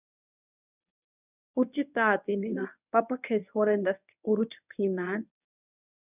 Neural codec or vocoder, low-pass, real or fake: codec, 24 kHz, 0.9 kbps, WavTokenizer, medium speech release version 1; 3.6 kHz; fake